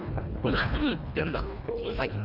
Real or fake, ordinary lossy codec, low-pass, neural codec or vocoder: fake; none; 5.4 kHz; codec, 24 kHz, 1.5 kbps, HILCodec